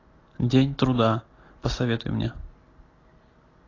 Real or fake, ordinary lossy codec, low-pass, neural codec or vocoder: real; AAC, 32 kbps; 7.2 kHz; none